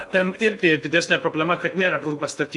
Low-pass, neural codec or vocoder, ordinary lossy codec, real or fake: 10.8 kHz; codec, 16 kHz in and 24 kHz out, 0.6 kbps, FocalCodec, streaming, 2048 codes; AAC, 64 kbps; fake